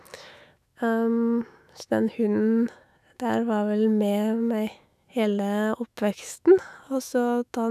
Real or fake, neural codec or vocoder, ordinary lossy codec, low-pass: fake; autoencoder, 48 kHz, 128 numbers a frame, DAC-VAE, trained on Japanese speech; none; 14.4 kHz